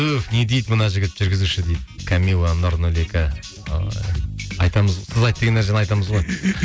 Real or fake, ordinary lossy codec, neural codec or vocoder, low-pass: real; none; none; none